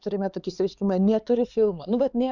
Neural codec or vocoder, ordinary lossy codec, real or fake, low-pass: codec, 16 kHz, 4 kbps, X-Codec, HuBERT features, trained on LibriSpeech; Opus, 64 kbps; fake; 7.2 kHz